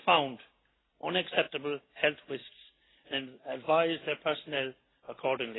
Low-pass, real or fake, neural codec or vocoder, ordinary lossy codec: 7.2 kHz; real; none; AAC, 16 kbps